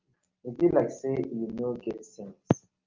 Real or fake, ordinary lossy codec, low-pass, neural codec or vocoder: real; Opus, 24 kbps; 7.2 kHz; none